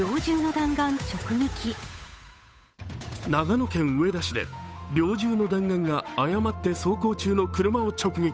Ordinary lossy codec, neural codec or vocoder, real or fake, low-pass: none; codec, 16 kHz, 8 kbps, FunCodec, trained on Chinese and English, 25 frames a second; fake; none